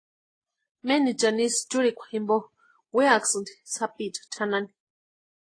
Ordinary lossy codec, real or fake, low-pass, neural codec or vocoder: AAC, 32 kbps; real; 9.9 kHz; none